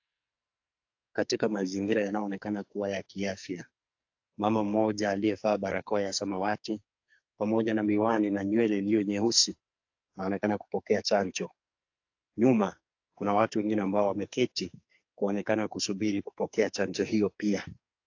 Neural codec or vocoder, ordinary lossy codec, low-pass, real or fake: codec, 44.1 kHz, 2.6 kbps, SNAC; AAC, 48 kbps; 7.2 kHz; fake